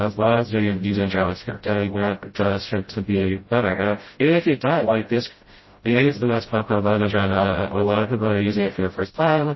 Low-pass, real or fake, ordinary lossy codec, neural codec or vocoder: 7.2 kHz; fake; MP3, 24 kbps; codec, 16 kHz, 0.5 kbps, FreqCodec, smaller model